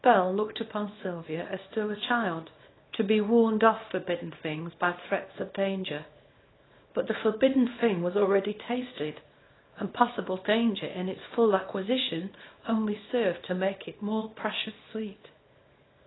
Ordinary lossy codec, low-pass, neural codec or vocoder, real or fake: AAC, 16 kbps; 7.2 kHz; codec, 24 kHz, 0.9 kbps, WavTokenizer, small release; fake